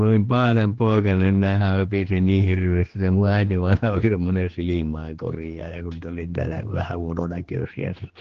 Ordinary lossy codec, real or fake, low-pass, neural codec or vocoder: Opus, 16 kbps; fake; 7.2 kHz; codec, 16 kHz, 2 kbps, X-Codec, HuBERT features, trained on general audio